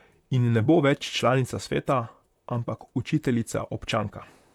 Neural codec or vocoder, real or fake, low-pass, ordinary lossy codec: vocoder, 44.1 kHz, 128 mel bands, Pupu-Vocoder; fake; 19.8 kHz; none